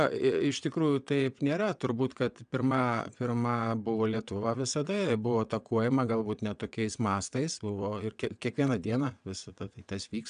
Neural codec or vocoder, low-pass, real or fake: vocoder, 22.05 kHz, 80 mel bands, WaveNeXt; 9.9 kHz; fake